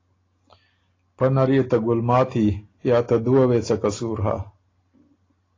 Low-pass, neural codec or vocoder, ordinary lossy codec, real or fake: 7.2 kHz; none; AAC, 32 kbps; real